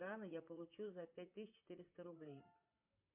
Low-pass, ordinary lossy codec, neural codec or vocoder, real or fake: 3.6 kHz; MP3, 32 kbps; vocoder, 44.1 kHz, 128 mel bands, Pupu-Vocoder; fake